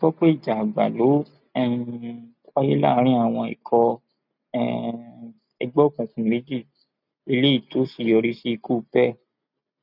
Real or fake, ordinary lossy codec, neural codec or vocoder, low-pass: real; none; none; 5.4 kHz